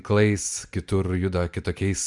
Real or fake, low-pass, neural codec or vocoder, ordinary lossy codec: real; 10.8 kHz; none; MP3, 96 kbps